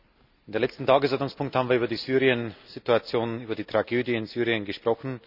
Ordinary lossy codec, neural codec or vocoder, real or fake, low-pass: none; none; real; 5.4 kHz